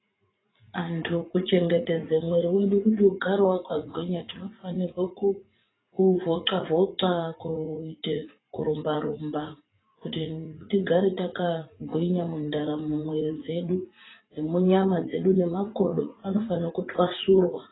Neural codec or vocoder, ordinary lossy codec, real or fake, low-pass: vocoder, 44.1 kHz, 80 mel bands, Vocos; AAC, 16 kbps; fake; 7.2 kHz